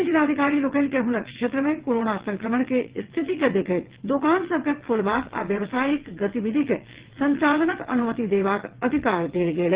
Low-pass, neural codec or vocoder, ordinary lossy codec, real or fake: 3.6 kHz; vocoder, 22.05 kHz, 80 mel bands, WaveNeXt; Opus, 16 kbps; fake